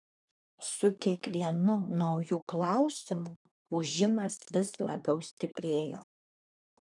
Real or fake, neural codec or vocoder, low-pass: fake; codec, 24 kHz, 1 kbps, SNAC; 10.8 kHz